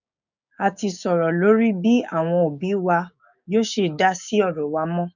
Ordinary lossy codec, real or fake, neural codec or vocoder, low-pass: none; fake; codec, 16 kHz, 6 kbps, DAC; 7.2 kHz